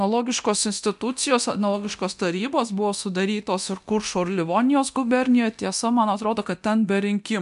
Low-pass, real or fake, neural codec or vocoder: 10.8 kHz; fake; codec, 24 kHz, 0.9 kbps, DualCodec